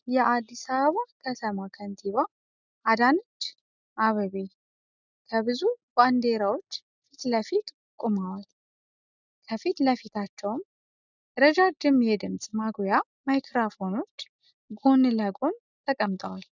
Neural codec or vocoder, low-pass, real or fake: none; 7.2 kHz; real